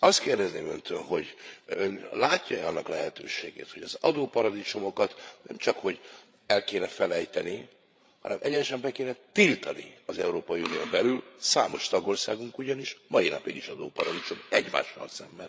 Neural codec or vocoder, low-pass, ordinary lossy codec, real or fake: codec, 16 kHz, 8 kbps, FreqCodec, larger model; none; none; fake